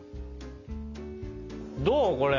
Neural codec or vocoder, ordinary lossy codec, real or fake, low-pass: none; none; real; 7.2 kHz